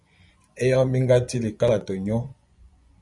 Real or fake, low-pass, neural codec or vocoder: fake; 10.8 kHz; vocoder, 24 kHz, 100 mel bands, Vocos